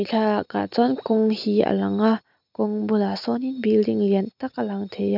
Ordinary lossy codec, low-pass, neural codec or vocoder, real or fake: none; 5.4 kHz; none; real